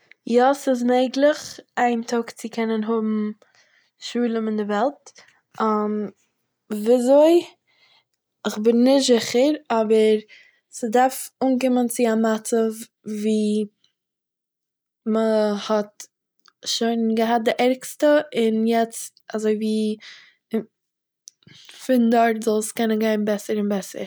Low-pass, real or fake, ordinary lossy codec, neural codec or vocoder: none; real; none; none